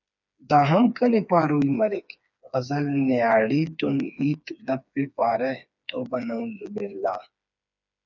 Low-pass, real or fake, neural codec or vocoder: 7.2 kHz; fake; codec, 16 kHz, 4 kbps, FreqCodec, smaller model